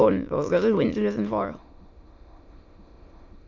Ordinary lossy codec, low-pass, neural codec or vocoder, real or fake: MP3, 48 kbps; 7.2 kHz; autoencoder, 22.05 kHz, a latent of 192 numbers a frame, VITS, trained on many speakers; fake